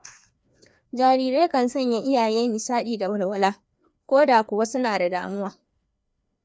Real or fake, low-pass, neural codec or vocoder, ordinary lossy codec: fake; none; codec, 16 kHz, 2 kbps, FreqCodec, larger model; none